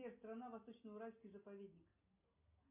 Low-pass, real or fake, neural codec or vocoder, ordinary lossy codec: 3.6 kHz; real; none; MP3, 32 kbps